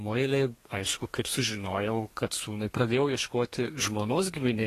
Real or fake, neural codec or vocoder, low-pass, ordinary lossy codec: fake; codec, 32 kHz, 1.9 kbps, SNAC; 14.4 kHz; AAC, 48 kbps